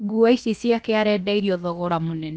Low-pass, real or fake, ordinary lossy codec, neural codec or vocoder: none; fake; none; codec, 16 kHz, about 1 kbps, DyCAST, with the encoder's durations